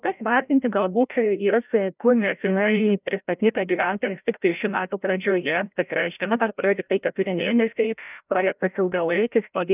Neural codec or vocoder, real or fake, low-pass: codec, 16 kHz, 0.5 kbps, FreqCodec, larger model; fake; 3.6 kHz